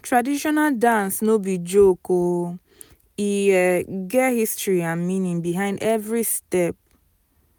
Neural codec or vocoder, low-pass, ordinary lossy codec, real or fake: none; none; none; real